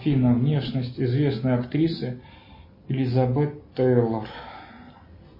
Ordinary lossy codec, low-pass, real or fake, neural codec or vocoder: MP3, 24 kbps; 5.4 kHz; real; none